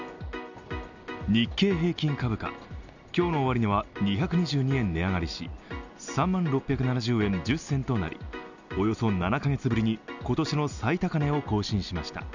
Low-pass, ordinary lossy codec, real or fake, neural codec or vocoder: 7.2 kHz; none; real; none